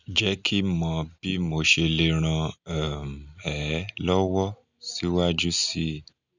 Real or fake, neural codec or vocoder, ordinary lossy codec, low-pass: real; none; none; 7.2 kHz